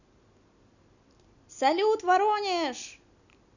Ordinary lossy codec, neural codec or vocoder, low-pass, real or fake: none; none; 7.2 kHz; real